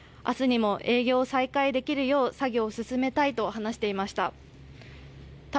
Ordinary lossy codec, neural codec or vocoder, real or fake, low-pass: none; none; real; none